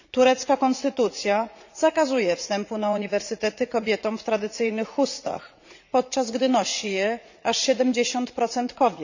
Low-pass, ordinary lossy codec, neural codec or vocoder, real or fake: 7.2 kHz; none; vocoder, 44.1 kHz, 80 mel bands, Vocos; fake